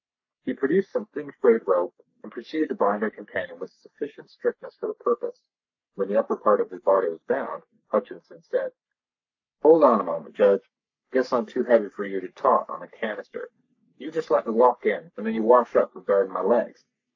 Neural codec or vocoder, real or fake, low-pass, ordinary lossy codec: codec, 44.1 kHz, 3.4 kbps, Pupu-Codec; fake; 7.2 kHz; AAC, 48 kbps